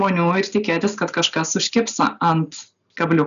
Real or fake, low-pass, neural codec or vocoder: real; 7.2 kHz; none